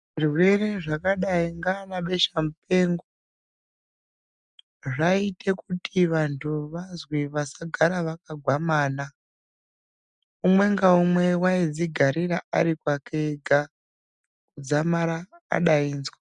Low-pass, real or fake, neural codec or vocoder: 10.8 kHz; real; none